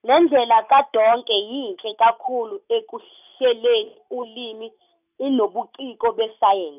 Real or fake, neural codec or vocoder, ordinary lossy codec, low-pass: real; none; none; 3.6 kHz